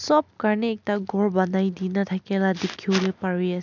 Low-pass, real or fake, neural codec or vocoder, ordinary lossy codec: 7.2 kHz; real; none; none